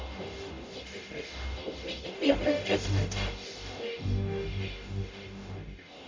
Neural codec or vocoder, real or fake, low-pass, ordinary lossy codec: codec, 44.1 kHz, 0.9 kbps, DAC; fake; 7.2 kHz; MP3, 64 kbps